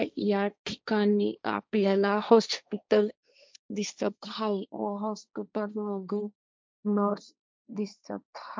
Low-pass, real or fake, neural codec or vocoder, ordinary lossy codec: none; fake; codec, 16 kHz, 1.1 kbps, Voila-Tokenizer; none